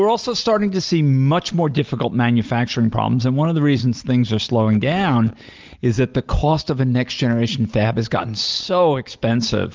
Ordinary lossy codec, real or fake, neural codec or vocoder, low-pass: Opus, 24 kbps; real; none; 7.2 kHz